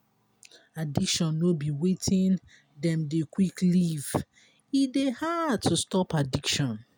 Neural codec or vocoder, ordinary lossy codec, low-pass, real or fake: none; none; none; real